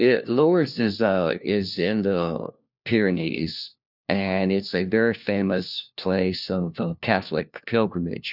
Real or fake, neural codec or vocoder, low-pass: fake; codec, 16 kHz, 1 kbps, FunCodec, trained on LibriTTS, 50 frames a second; 5.4 kHz